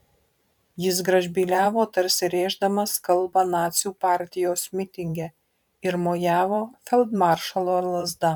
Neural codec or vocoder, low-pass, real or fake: vocoder, 44.1 kHz, 128 mel bands every 512 samples, BigVGAN v2; 19.8 kHz; fake